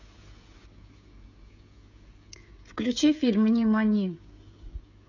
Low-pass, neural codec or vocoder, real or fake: 7.2 kHz; codec, 16 kHz, 16 kbps, FreqCodec, smaller model; fake